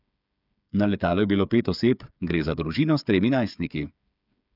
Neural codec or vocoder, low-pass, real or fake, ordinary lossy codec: codec, 16 kHz, 8 kbps, FreqCodec, smaller model; 5.4 kHz; fake; none